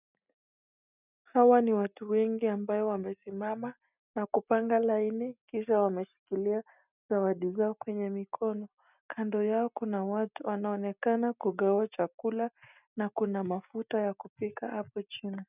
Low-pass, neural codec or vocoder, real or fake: 3.6 kHz; none; real